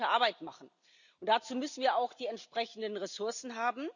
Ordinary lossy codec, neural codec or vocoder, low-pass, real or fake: none; none; 7.2 kHz; real